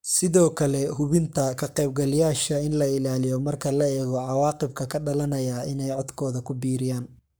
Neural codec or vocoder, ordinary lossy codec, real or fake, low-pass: codec, 44.1 kHz, 7.8 kbps, Pupu-Codec; none; fake; none